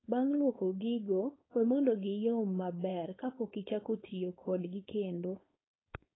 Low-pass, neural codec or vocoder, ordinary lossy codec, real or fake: 7.2 kHz; codec, 16 kHz, 4.8 kbps, FACodec; AAC, 16 kbps; fake